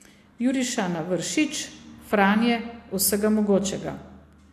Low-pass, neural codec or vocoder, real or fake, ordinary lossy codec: 14.4 kHz; none; real; AAC, 64 kbps